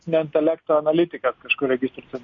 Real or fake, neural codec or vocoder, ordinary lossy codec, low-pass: real; none; MP3, 48 kbps; 7.2 kHz